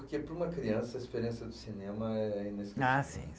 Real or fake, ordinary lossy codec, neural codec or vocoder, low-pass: real; none; none; none